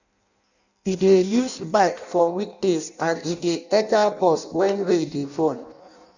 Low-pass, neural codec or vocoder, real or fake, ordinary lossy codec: 7.2 kHz; codec, 16 kHz in and 24 kHz out, 0.6 kbps, FireRedTTS-2 codec; fake; none